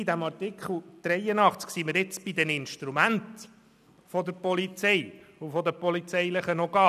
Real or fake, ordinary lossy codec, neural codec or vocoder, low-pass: real; none; none; 14.4 kHz